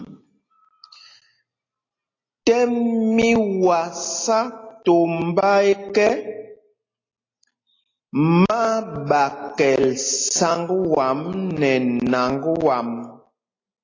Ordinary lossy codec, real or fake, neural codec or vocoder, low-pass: AAC, 32 kbps; real; none; 7.2 kHz